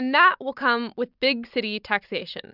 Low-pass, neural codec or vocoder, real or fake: 5.4 kHz; none; real